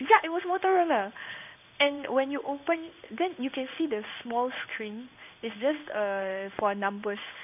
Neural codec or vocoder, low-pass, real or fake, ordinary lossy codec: codec, 16 kHz in and 24 kHz out, 1 kbps, XY-Tokenizer; 3.6 kHz; fake; none